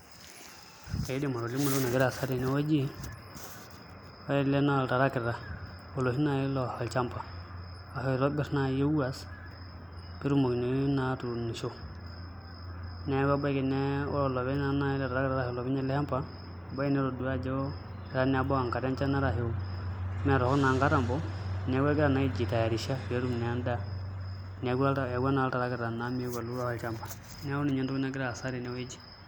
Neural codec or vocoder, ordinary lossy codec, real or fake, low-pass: none; none; real; none